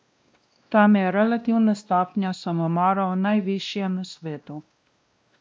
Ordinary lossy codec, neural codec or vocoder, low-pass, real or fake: none; codec, 16 kHz, 1 kbps, X-Codec, WavLM features, trained on Multilingual LibriSpeech; none; fake